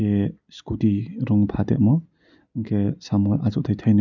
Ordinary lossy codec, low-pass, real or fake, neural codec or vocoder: none; 7.2 kHz; real; none